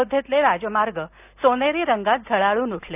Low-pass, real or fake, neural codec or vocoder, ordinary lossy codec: 3.6 kHz; real; none; none